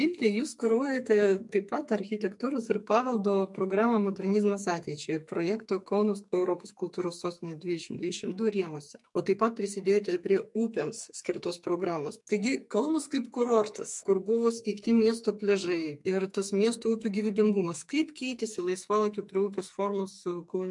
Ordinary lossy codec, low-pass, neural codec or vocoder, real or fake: MP3, 64 kbps; 10.8 kHz; codec, 44.1 kHz, 2.6 kbps, SNAC; fake